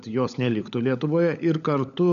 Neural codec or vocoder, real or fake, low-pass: codec, 16 kHz, 16 kbps, FunCodec, trained on LibriTTS, 50 frames a second; fake; 7.2 kHz